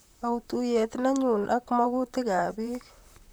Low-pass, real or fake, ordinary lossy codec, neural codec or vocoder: none; fake; none; vocoder, 44.1 kHz, 128 mel bands, Pupu-Vocoder